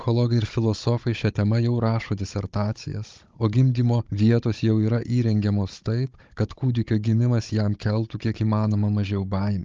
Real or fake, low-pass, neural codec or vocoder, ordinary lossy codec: fake; 7.2 kHz; codec, 16 kHz, 16 kbps, FunCodec, trained on Chinese and English, 50 frames a second; Opus, 24 kbps